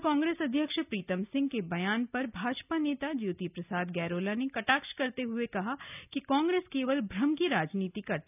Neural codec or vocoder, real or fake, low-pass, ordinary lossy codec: none; real; 3.6 kHz; none